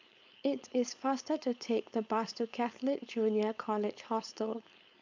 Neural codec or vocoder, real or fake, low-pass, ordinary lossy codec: codec, 16 kHz, 4.8 kbps, FACodec; fake; 7.2 kHz; none